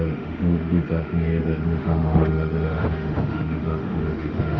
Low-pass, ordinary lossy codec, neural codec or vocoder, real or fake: 7.2 kHz; none; autoencoder, 48 kHz, 32 numbers a frame, DAC-VAE, trained on Japanese speech; fake